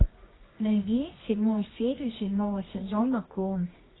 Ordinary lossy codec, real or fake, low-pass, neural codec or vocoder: AAC, 16 kbps; fake; 7.2 kHz; codec, 24 kHz, 0.9 kbps, WavTokenizer, medium music audio release